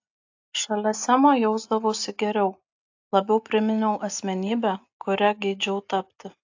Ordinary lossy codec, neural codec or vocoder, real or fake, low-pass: AAC, 48 kbps; none; real; 7.2 kHz